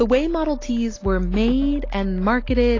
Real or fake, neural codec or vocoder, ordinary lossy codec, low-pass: real; none; AAC, 32 kbps; 7.2 kHz